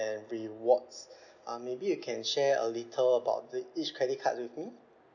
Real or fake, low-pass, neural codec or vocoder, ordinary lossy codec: real; 7.2 kHz; none; none